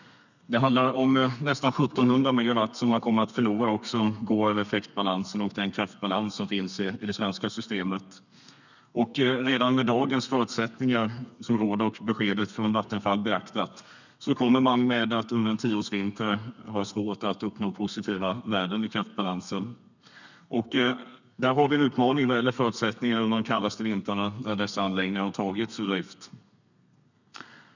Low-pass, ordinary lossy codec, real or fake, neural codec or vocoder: 7.2 kHz; none; fake; codec, 32 kHz, 1.9 kbps, SNAC